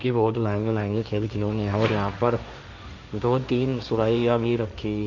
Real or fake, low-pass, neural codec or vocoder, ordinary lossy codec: fake; 7.2 kHz; codec, 16 kHz, 1.1 kbps, Voila-Tokenizer; none